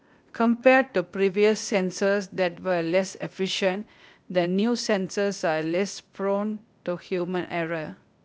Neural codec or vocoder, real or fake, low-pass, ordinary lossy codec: codec, 16 kHz, 0.8 kbps, ZipCodec; fake; none; none